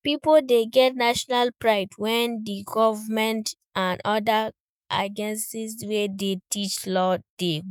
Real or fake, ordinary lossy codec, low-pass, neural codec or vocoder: fake; none; none; autoencoder, 48 kHz, 128 numbers a frame, DAC-VAE, trained on Japanese speech